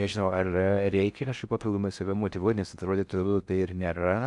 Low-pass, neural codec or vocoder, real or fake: 10.8 kHz; codec, 16 kHz in and 24 kHz out, 0.6 kbps, FocalCodec, streaming, 4096 codes; fake